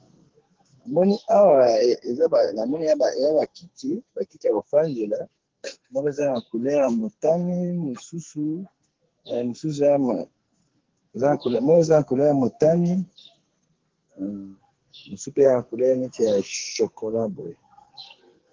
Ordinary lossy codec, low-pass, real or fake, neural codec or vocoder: Opus, 16 kbps; 7.2 kHz; fake; codec, 44.1 kHz, 2.6 kbps, SNAC